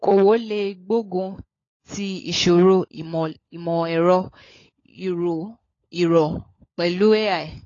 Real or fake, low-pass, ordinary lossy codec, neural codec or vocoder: fake; 7.2 kHz; AAC, 32 kbps; codec, 16 kHz, 16 kbps, FunCodec, trained on LibriTTS, 50 frames a second